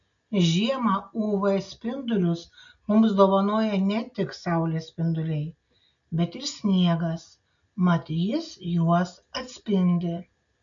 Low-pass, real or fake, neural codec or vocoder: 7.2 kHz; real; none